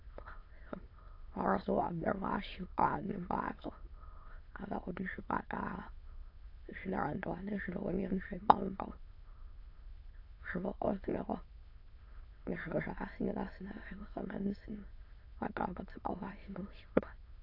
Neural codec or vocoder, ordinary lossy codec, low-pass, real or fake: autoencoder, 22.05 kHz, a latent of 192 numbers a frame, VITS, trained on many speakers; AAC, 32 kbps; 5.4 kHz; fake